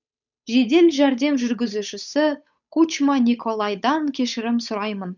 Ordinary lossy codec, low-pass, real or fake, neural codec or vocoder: none; 7.2 kHz; fake; codec, 16 kHz, 8 kbps, FunCodec, trained on Chinese and English, 25 frames a second